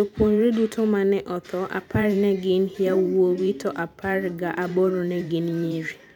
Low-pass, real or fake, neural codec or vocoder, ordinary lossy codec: 19.8 kHz; fake; vocoder, 44.1 kHz, 128 mel bands every 512 samples, BigVGAN v2; none